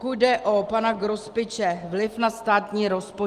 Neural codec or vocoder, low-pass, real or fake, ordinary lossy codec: none; 14.4 kHz; real; Opus, 24 kbps